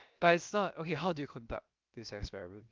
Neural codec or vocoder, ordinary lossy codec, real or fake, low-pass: codec, 16 kHz, about 1 kbps, DyCAST, with the encoder's durations; Opus, 24 kbps; fake; 7.2 kHz